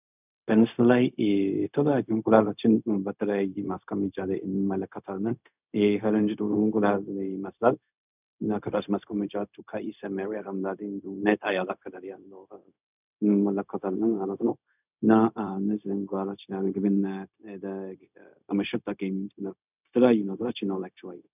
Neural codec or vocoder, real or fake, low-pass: codec, 16 kHz, 0.4 kbps, LongCat-Audio-Codec; fake; 3.6 kHz